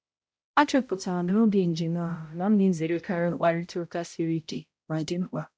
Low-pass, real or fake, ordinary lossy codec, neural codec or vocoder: none; fake; none; codec, 16 kHz, 0.5 kbps, X-Codec, HuBERT features, trained on balanced general audio